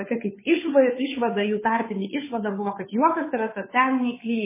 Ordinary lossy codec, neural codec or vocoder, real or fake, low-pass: MP3, 16 kbps; codec, 16 kHz, 2 kbps, FunCodec, trained on Chinese and English, 25 frames a second; fake; 3.6 kHz